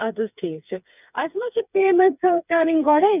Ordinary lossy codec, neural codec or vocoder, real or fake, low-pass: none; codec, 16 kHz, 4 kbps, FreqCodec, smaller model; fake; 3.6 kHz